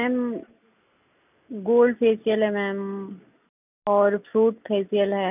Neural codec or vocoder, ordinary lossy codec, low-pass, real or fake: none; none; 3.6 kHz; real